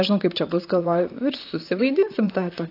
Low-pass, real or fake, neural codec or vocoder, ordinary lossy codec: 5.4 kHz; fake; vocoder, 44.1 kHz, 128 mel bands, Pupu-Vocoder; AAC, 32 kbps